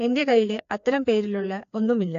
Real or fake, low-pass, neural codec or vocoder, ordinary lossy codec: fake; 7.2 kHz; codec, 16 kHz, 2 kbps, FreqCodec, larger model; AAC, 64 kbps